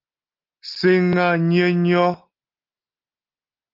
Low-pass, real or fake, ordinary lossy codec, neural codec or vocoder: 5.4 kHz; real; Opus, 32 kbps; none